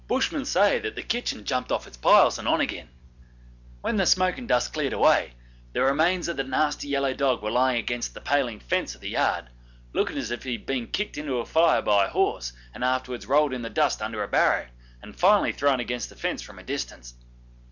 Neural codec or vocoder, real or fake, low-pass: none; real; 7.2 kHz